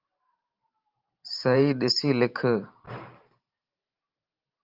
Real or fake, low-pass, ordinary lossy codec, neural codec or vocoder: fake; 5.4 kHz; Opus, 24 kbps; vocoder, 44.1 kHz, 128 mel bands every 512 samples, BigVGAN v2